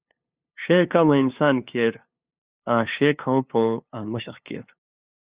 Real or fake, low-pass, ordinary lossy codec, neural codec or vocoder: fake; 3.6 kHz; Opus, 64 kbps; codec, 16 kHz, 2 kbps, FunCodec, trained on LibriTTS, 25 frames a second